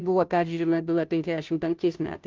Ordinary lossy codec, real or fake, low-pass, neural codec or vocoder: Opus, 32 kbps; fake; 7.2 kHz; codec, 16 kHz, 0.5 kbps, FunCodec, trained on Chinese and English, 25 frames a second